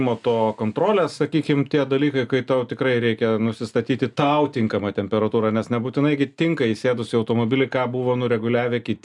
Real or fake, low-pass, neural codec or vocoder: real; 10.8 kHz; none